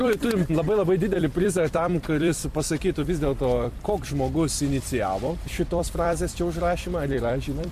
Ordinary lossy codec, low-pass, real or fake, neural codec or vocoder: MP3, 64 kbps; 14.4 kHz; fake; vocoder, 44.1 kHz, 128 mel bands every 256 samples, BigVGAN v2